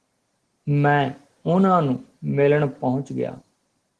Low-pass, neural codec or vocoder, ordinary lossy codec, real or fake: 10.8 kHz; none; Opus, 16 kbps; real